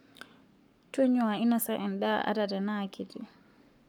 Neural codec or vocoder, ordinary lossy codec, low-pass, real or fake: codec, 44.1 kHz, 7.8 kbps, Pupu-Codec; none; 19.8 kHz; fake